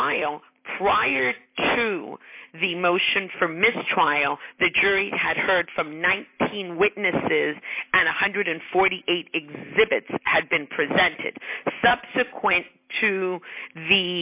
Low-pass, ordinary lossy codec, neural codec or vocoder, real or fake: 3.6 kHz; AAC, 32 kbps; none; real